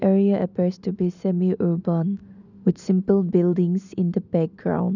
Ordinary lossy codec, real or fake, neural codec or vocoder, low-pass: none; real; none; 7.2 kHz